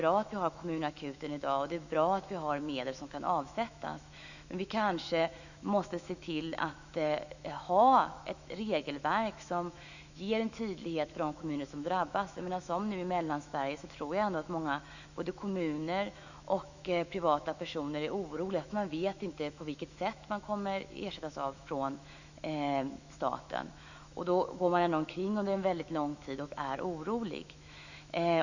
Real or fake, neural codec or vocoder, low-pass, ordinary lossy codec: fake; autoencoder, 48 kHz, 128 numbers a frame, DAC-VAE, trained on Japanese speech; 7.2 kHz; none